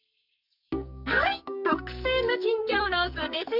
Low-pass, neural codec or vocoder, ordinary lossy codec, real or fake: 5.4 kHz; codec, 44.1 kHz, 2.6 kbps, SNAC; none; fake